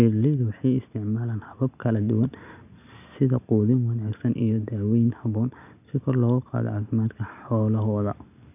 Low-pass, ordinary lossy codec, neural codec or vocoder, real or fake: 3.6 kHz; none; none; real